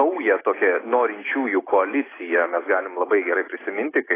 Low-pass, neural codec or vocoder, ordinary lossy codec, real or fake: 3.6 kHz; none; AAC, 16 kbps; real